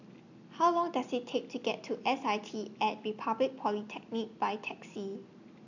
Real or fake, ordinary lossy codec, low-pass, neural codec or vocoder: real; none; 7.2 kHz; none